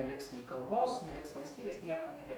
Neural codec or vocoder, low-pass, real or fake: codec, 44.1 kHz, 2.6 kbps, DAC; 19.8 kHz; fake